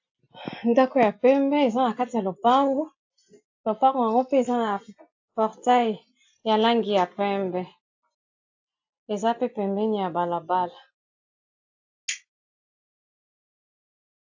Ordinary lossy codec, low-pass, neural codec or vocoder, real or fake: AAC, 48 kbps; 7.2 kHz; none; real